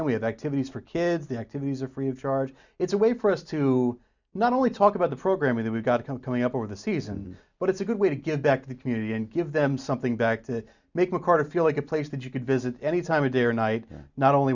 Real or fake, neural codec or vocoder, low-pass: real; none; 7.2 kHz